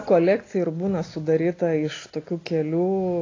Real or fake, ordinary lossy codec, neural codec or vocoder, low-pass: real; AAC, 32 kbps; none; 7.2 kHz